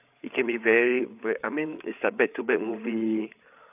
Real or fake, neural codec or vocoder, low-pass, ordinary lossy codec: fake; codec, 16 kHz, 8 kbps, FreqCodec, larger model; 3.6 kHz; none